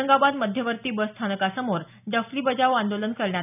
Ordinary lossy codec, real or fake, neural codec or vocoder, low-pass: none; real; none; 3.6 kHz